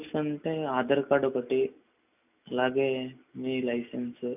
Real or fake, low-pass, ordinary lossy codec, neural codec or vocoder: real; 3.6 kHz; none; none